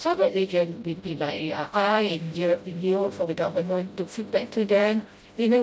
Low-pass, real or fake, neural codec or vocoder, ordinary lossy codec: none; fake; codec, 16 kHz, 0.5 kbps, FreqCodec, smaller model; none